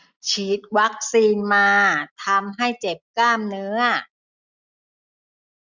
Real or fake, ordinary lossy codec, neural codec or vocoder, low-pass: real; none; none; 7.2 kHz